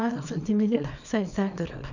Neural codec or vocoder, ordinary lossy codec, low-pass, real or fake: codec, 24 kHz, 0.9 kbps, WavTokenizer, small release; none; 7.2 kHz; fake